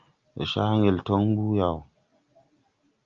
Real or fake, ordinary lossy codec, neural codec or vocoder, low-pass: real; Opus, 24 kbps; none; 7.2 kHz